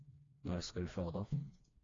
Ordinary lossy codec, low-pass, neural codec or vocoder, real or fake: AAC, 32 kbps; 7.2 kHz; codec, 16 kHz, 2 kbps, FreqCodec, smaller model; fake